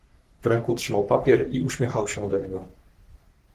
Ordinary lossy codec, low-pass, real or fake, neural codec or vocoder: Opus, 16 kbps; 14.4 kHz; fake; codec, 44.1 kHz, 3.4 kbps, Pupu-Codec